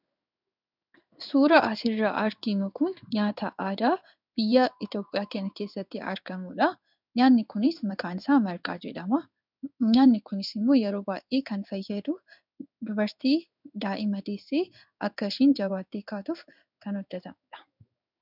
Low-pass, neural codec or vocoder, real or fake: 5.4 kHz; codec, 16 kHz in and 24 kHz out, 1 kbps, XY-Tokenizer; fake